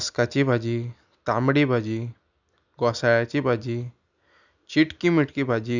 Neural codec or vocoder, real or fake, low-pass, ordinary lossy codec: none; real; 7.2 kHz; none